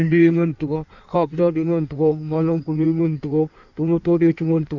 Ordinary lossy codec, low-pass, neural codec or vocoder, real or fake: none; 7.2 kHz; codec, 16 kHz in and 24 kHz out, 1.1 kbps, FireRedTTS-2 codec; fake